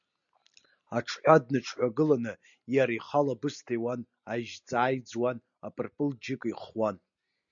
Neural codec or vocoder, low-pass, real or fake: none; 7.2 kHz; real